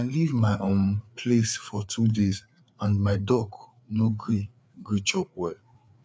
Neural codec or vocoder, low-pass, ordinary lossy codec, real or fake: codec, 16 kHz, 4 kbps, FreqCodec, larger model; none; none; fake